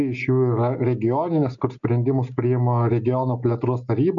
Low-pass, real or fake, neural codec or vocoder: 7.2 kHz; real; none